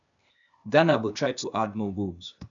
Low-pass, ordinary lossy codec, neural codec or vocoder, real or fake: 7.2 kHz; none; codec, 16 kHz, 0.8 kbps, ZipCodec; fake